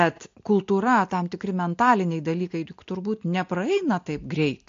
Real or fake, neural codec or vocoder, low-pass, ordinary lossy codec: real; none; 7.2 kHz; AAC, 48 kbps